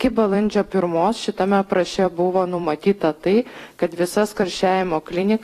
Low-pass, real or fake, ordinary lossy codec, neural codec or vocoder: 14.4 kHz; fake; AAC, 48 kbps; vocoder, 44.1 kHz, 128 mel bands every 256 samples, BigVGAN v2